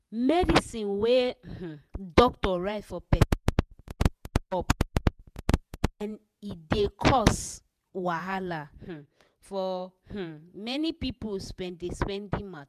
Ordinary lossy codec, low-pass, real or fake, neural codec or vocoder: none; 14.4 kHz; fake; vocoder, 48 kHz, 128 mel bands, Vocos